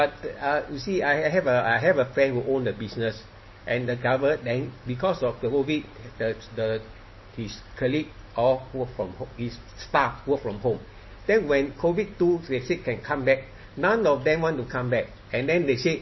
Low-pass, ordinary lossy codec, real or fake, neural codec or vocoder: 7.2 kHz; MP3, 24 kbps; real; none